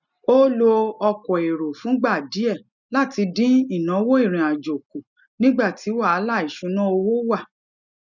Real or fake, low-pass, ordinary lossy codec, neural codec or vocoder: real; 7.2 kHz; none; none